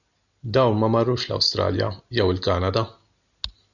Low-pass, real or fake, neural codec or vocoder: 7.2 kHz; real; none